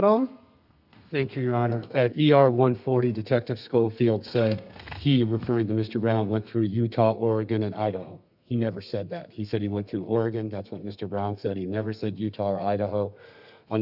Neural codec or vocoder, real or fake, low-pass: codec, 32 kHz, 1.9 kbps, SNAC; fake; 5.4 kHz